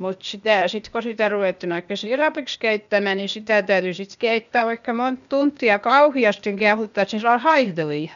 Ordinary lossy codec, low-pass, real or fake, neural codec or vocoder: none; 7.2 kHz; fake; codec, 16 kHz, 0.8 kbps, ZipCodec